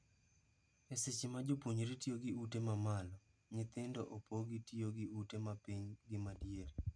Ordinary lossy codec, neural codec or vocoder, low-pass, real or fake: none; none; 9.9 kHz; real